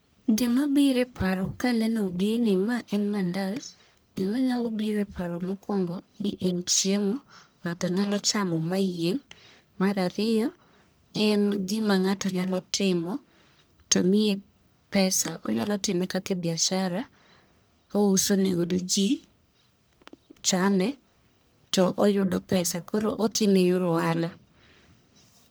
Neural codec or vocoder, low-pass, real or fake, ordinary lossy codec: codec, 44.1 kHz, 1.7 kbps, Pupu-Codec; none; fake; none